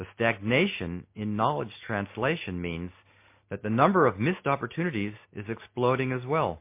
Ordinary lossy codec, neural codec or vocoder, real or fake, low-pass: MP3, 24 kbps; none; real; 3.6 kHz